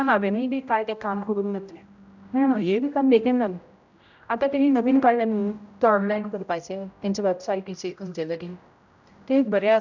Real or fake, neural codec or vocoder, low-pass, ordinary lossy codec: fake; codec, 16 kHz, 0.5 kbps, X-Codec, HuBERT features, trained on general audio; 7.2 kHz; none